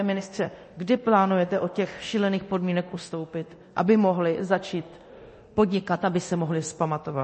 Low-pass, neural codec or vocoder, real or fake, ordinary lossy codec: 10.8 kHz; codec, 24 kHz, 0.9 kbps, DualCodec; fake; MP3, 32 kbps